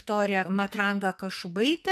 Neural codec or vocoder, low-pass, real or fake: codec, 44.1 kHz, 2.6 kbps, SNAC; 14.4 kHz; fake